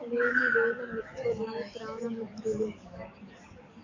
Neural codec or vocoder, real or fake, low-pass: codec, 44.1 kHz, 7.8 kbps, DAC; fake; 7.2 kHz